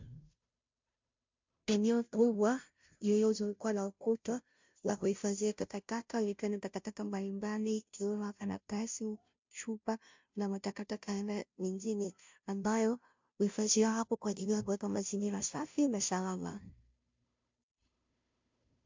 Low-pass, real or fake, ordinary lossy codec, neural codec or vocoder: 7.2 kHz; fake; MP3, 64 kbps; codec, 16 kHz, 0.5 kbps, FunCodec, trained on Chinese and English, 25 frames a second